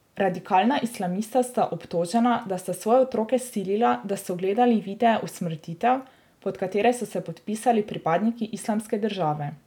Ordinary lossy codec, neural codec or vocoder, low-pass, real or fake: none; none; 19.8 kHz; real